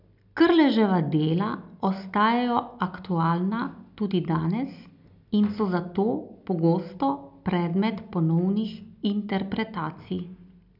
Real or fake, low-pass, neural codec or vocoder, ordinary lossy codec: real; 5.4 kHz; none; none